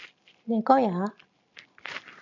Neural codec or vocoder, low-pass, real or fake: none; 7.2 kHz; real